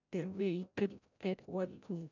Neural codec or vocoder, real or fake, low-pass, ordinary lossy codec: codec, 16 kHz, 0.5 kbps, FreqCodec, larger model; fake; 7.2 kHz; none